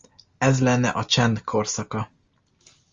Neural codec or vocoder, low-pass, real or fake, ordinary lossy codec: none; 7.2 kHz; real; Opus, 32 kbps